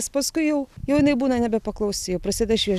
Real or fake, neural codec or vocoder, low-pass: real; none; 14.4 kHz